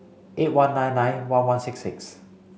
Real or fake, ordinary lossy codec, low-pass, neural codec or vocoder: real; none; none; none